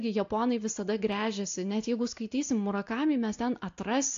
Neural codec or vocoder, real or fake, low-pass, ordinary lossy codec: none; real; 7.2 kHz; AAC, 48 kbps